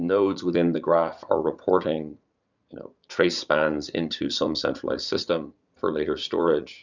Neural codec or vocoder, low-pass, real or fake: vocoder, 44.1 kHz, 80 mel bands, Vocos; 7.2 kHz; fake